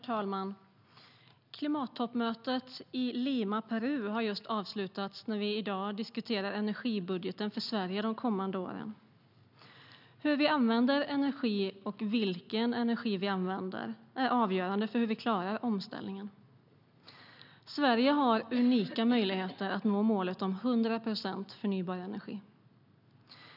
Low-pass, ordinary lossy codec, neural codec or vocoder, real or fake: 5.4 kHz; none; none; real